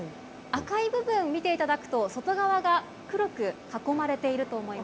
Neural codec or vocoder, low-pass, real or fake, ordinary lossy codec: none; none; real; none